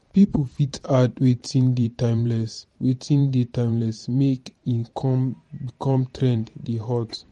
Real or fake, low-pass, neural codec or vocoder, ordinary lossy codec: fake; 19.8 kHz; vocoder, 44.1 kHz, 128 mel bands every 512 samples, BigVGAN v2; MP3, 48 kbps